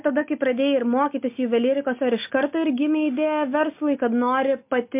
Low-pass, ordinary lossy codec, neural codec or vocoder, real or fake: 3.6 kHz; MP3, 32 kbps; none; real